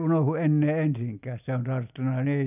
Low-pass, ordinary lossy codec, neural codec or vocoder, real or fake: 3.6 kHz; none; none; real